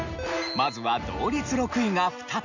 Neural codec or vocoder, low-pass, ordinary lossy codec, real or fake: none; 7.2 kHz; none; real